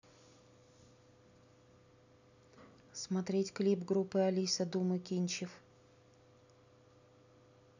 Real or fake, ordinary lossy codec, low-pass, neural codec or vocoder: real; none; 7.2 kHz; none